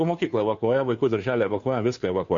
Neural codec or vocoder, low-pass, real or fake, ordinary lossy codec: codec, 16 kHz, 2 kbps, FunCodec, trained on Chinese and English, 25 frames a second; 7.2 kHz; fake; MP3, 48 kbps